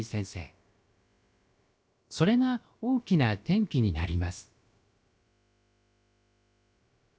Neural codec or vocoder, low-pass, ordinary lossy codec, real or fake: codec, 16 kHz, about 1 kbps, DyCAST, with the encoder's durations; none; none; fake